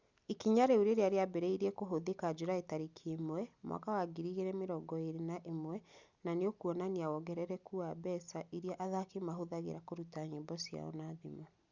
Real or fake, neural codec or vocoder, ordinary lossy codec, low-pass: real; none; Opus, 64 kbps; 7.2 kHz